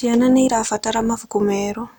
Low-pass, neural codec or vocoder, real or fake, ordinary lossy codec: none; none; real; none